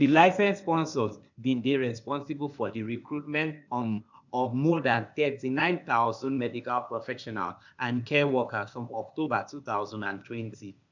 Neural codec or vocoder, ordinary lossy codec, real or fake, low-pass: codec, 16 kHz, 0.8 kbps, ZipCodec; none; fake; 7.2 kHz